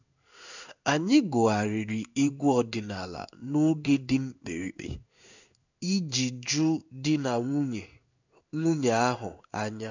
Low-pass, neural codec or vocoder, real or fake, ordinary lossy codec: 7.2 kHz; codec, 16 kHz in and 24 kHz out, 1 kbps, XY-Tokenizer; fake; none